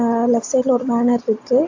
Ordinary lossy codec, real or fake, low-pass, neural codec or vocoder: AAC, 48 kbps; real; 7.2 kHz; none